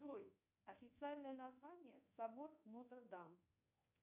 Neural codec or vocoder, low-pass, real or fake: codec, 24 kHz, 1.2 kbps, DualCodec; 3.6 kHz; fake